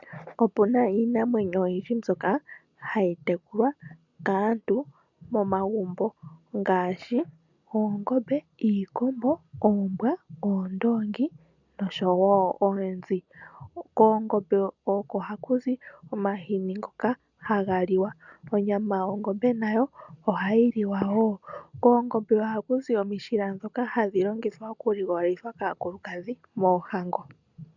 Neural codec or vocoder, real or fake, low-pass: none; real; 7.2 kHz